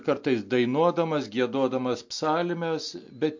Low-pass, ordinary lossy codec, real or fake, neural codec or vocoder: 7.2 kHz; MP3, 48 kbps; real; none